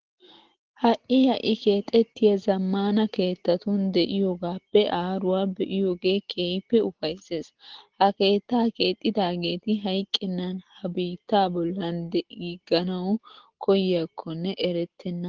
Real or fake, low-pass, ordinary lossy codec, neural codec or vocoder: real; 7.2 kHz; Opus, 16 kbps; none